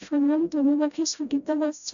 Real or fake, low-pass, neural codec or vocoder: fake; 7.2 kHz; codec, 16 kHz, 0.5 kbps, FreqCodec, smaller model